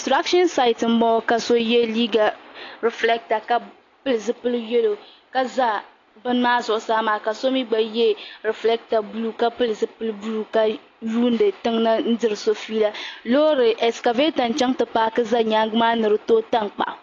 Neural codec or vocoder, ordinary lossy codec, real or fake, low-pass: none; AAC, 32 kbps; real; 7.2 kHz